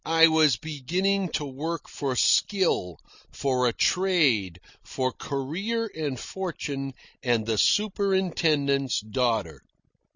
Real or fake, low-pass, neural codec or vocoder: real; 7.2 kHz; none